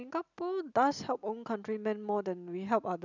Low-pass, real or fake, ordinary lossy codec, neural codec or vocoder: 7.2 kHz; real; none; none